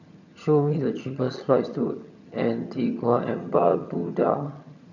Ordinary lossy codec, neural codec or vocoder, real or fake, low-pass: none; vocoder, 22.05 kHz, 80 mel bands, HiFi-GAN; fake; 7.2 kHz